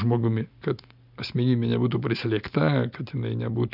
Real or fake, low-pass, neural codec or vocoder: real; 5.4 kHz; none